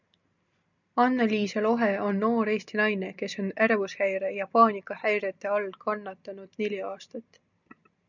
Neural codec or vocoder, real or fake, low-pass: none; real; 7.2 kHz